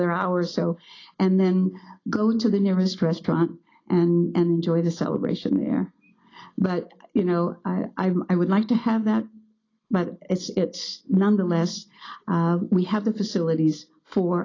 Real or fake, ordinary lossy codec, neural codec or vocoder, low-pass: real; AAC, 32 kbps; none; 7.2 kHz